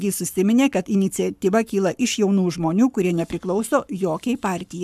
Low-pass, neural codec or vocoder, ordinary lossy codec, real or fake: 14.4 kHz; codec, 44.1 kHz, 7.8 kbps, Pupu-Codec; AAC, 96 kbps; fake